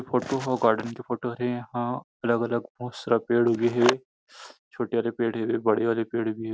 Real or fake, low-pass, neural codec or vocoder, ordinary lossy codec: real; none; none; none